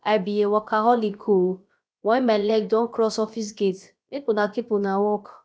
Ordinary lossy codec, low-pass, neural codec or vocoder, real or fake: none; none; codec, 16 kHz, about 1 kbps, DyCAST, with the encoder's durations; fake